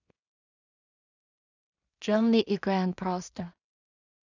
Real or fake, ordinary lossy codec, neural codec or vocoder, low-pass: fake; none; codec, 16 kHz in and 24 kHz out, 0.4 kbps, LongCat-Audio-Codec, two codebook decoder; 7.2 kHz